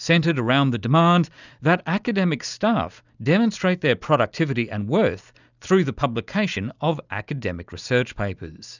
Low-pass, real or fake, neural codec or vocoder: 7.2 kHz; real; none